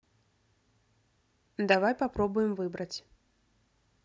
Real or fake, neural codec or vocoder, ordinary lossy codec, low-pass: real; none; none; none